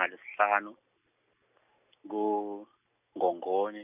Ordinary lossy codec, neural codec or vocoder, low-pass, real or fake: none; none; 3.6 kHz; real